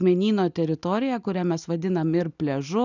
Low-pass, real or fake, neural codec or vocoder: 7.2 kHz; real; none